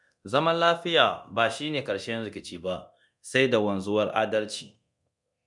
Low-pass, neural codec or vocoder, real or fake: 10.8 kHz; codec, 24 kHz, 0.9 kbps, DualCodec; fake